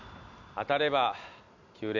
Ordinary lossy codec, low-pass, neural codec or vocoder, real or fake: none; 7.2 kHz; none; real